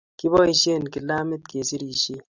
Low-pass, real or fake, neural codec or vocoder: 7.2 kHz; real; none